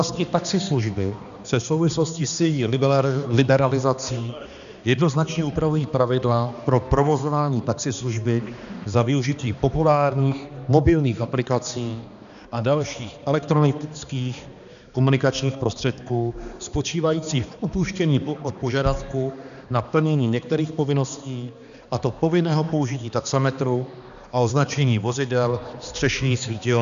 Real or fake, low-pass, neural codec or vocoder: fake; 7.2 kHz; codec, 16 kHz, 2 kbps, X-Codec, HuBERT features, trained on balanced general audio